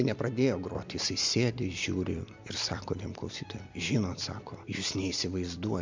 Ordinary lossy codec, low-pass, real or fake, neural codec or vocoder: MP3, 64 kbps; 7.2 kHz; real; none